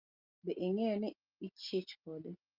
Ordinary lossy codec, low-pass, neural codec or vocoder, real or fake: Opus, 24 kbps; 5.4 kHz; none; real